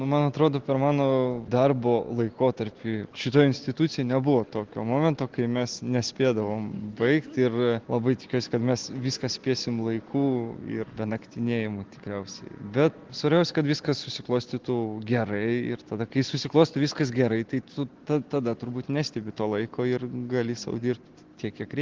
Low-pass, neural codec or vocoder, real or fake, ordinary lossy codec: 7.2 kHz; none; real; Opus, 16 kbps